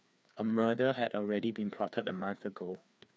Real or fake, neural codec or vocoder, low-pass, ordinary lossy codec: fake; codec, 16 kHz, 2 kbps, FreqCodec, larger model; none; none